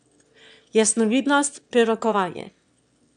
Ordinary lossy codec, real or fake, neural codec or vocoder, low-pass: none; fake; autoencoder, 22.05 kHz, a latent of 192 numbers a frame, VITS, trained on one speaker; 9.9 kHz